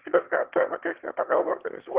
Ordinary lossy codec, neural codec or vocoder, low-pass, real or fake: Opus, 16 kbps; autoencoder, 22.05 kHz, a latent of 192 numbers a frame, VITS, trained on one speaker; 3.6 kHz; fake